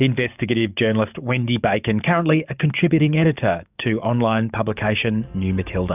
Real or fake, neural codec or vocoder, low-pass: fake; codec, 44.1 kHz, 7.8 kbps, DAC; 3.6 kHz